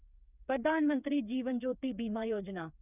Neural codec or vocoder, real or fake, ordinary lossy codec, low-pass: codec, 16 kHz, 4 kbps, FreqCodec, smaller model; fake; none; 3.6 kHz